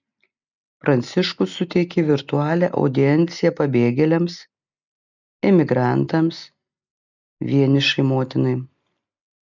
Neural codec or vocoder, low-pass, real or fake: none; 7.2 kHz; real